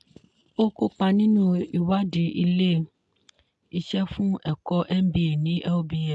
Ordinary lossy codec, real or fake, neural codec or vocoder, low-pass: AAC, 64 kbps; real; none; 10.8 kHz